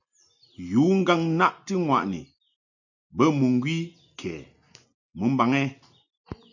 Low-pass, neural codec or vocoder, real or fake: 7.2 kHz; none; real